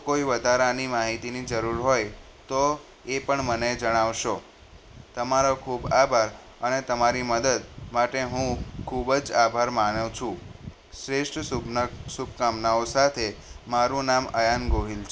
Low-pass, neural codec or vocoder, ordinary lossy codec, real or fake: none; none; none; real